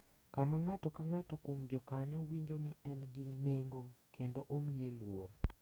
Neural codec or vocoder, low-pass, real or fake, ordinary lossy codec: codec, 44.1 kHz, 2.6 kbps, DAC; none; fake; none